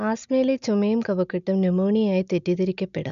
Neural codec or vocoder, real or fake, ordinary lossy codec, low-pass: none; real; none; 7.2 kHz